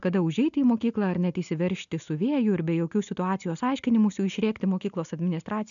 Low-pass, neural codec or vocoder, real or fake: 7.2 kHz; none; real